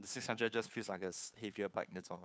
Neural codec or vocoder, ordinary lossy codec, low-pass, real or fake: codec, 16 kHz, 2 kbps, FunCodec, trained on Chinese and English, 25 frames a second; none; none; fake